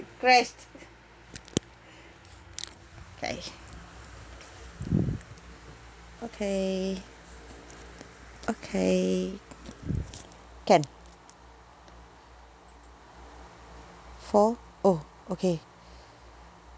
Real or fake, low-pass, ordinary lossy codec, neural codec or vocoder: real; none; none; none